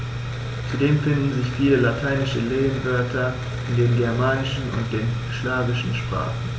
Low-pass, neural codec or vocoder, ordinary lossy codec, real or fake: none; none; none; real